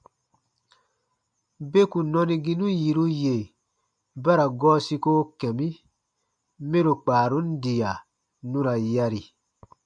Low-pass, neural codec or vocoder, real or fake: 9.9 kHz; none; real